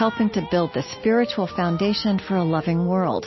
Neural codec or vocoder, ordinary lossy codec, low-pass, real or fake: none; MP3, 24 kbps; 7.2 kHz; real